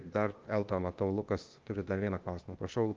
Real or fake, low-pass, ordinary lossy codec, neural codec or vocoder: fake; 7.2 kHz; Opus, 24 kbps; codec, 16 kHz, 0.8 kbps, ZipCodec